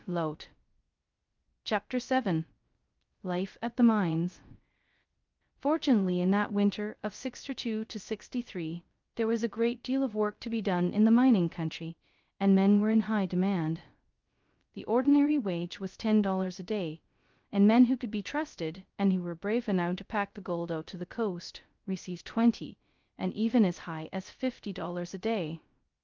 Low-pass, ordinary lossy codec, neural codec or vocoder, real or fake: 7.2 kHz; Opus, 32 kbps; codec, 16 kHz, 0.2 kbps, FocalCodec; fake